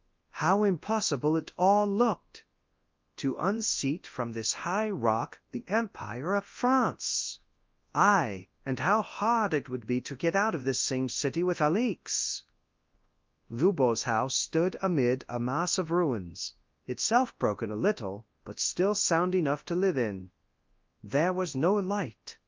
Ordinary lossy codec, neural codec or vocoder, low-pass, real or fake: Opus, 32 kbps; codec, 24 kHz, 0.9 kbps, WavTokenizer, large speech release; 7.2 kHz; fake